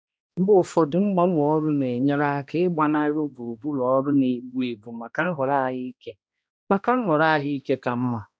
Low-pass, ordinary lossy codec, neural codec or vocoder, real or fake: none; none; codec, 16 kHz, 1 kbps, X-Codec, HuBERT features, trained on balanced general audio; fake